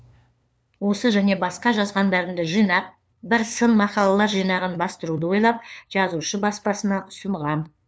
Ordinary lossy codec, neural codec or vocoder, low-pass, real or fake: none; codec, 16 kHz, 2 kbps, FunCodec, trained on LibriTTS, 25 frames a second; none; fake